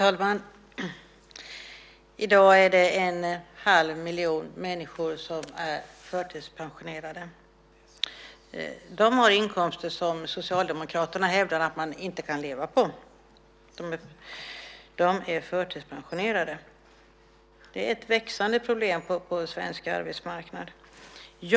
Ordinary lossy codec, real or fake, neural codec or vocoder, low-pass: none; real; none; none